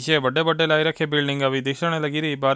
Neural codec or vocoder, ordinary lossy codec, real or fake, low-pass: none; none; real; none